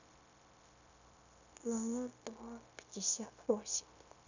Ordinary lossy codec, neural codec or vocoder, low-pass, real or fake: none; codec, 16 kHz, 0.9 kbps, LongCat-Audio-Codec; 7.2 kHz; fake